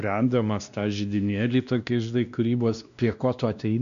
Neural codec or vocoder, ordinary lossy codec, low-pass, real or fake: codec, 16 kHz, 1 kbps, X-Codec, WavLM features, trained on Multilingual LibriSpeech; MP3, 96 kbps; 7.2 kHz; fake